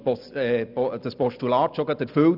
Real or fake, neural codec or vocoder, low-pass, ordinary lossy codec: real; none; 5.4 kHz; none